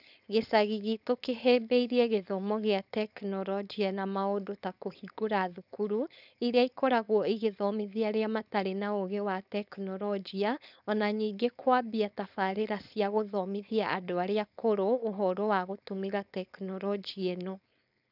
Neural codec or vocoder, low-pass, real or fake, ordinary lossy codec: codec, 16 kHz, 4.8 kbps, FACodec; 5.4 kHz; fake; none